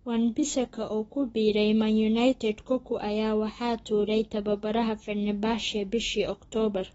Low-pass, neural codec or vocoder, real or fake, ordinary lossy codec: 19.8 kHz; autoencoder, 48 kHz, 128 numbers a frame, DAC-VAE, trained on Japanese speech; fake; AAC, 24 kbps